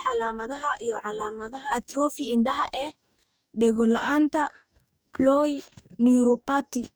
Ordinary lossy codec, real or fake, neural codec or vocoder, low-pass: none; fake; codec, 44.1 kHz, 2.6 kbps, DAC; none